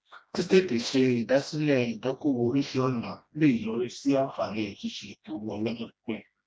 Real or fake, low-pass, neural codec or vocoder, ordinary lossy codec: fake; none; codec, 16 kHz, 1 kbps, FreqCodec, smaller model; none